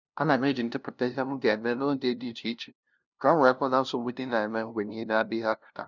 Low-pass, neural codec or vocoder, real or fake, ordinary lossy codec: 7.2 kHz; codec, 16 kHz, 0.5 kbps, FunCodec, trained on LibriTTS, 25 frames a second; fake; none